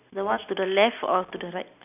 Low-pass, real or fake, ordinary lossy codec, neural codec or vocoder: 3.6 kHz; real; none; none